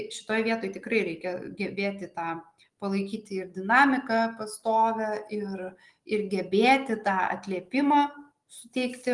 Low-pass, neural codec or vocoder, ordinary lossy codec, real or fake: 10.8 kHz; none; Opus, 24 kbps; real